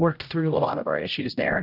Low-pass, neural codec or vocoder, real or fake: 5.4 kHz; codec, 16 kHz, 0.5 kbps, X-Codec, HuBERT features, trained on general audio; fake